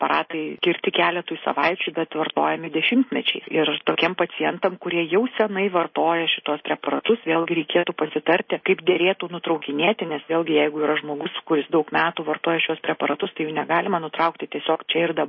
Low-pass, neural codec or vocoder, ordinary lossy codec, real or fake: 7.2 kHz; none; MP3, 24 kbps; real